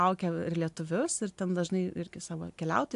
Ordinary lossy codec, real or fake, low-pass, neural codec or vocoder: AAC, 64 kbps; real; 10.8 kHz; none